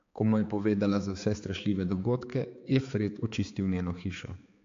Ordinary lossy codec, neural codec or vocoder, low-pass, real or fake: AAC, 48 kbps; codec, 16 kHz, 4 kbps, X-Codec, HuBERT features, trained on balanced general audio; 7.2 kHz; fake